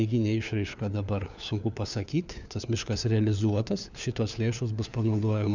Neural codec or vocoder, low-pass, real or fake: codec, 16 kHz, 4 kbps, FunCodec, trained on LibriTTS, 50 frames a second; 7.2 kHz; fake